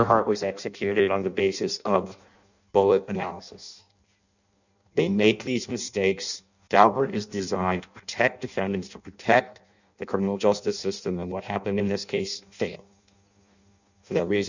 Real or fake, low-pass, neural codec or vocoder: fake; 7.2 kHz; codec, 16 kHz in and 24 kHz out, 0.6 kbps, FireRedTTS-2 codec